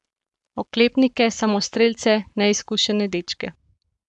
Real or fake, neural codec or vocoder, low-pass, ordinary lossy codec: fake; codec, 44.1 kHz, 7.8 kbps, Pupu-Codec; 10.8 kHz; none